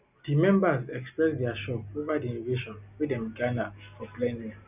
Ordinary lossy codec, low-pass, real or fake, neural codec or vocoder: none; 3.6 kHz; real; none